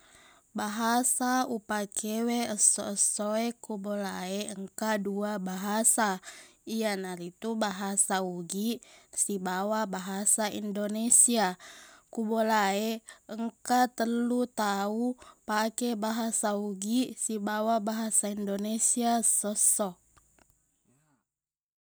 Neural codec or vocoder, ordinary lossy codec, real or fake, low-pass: none; none; real; none